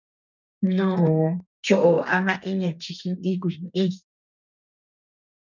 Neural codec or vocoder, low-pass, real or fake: codec, 32 kHz, 1.9 kbps, SNAC; 7.2 kHz; fake